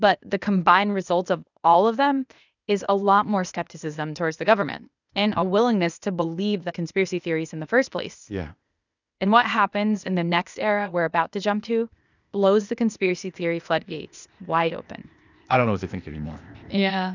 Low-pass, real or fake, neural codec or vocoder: 7.2 kHz; fake; codec, 16 kHz, 0.8 kbps, ZipCodec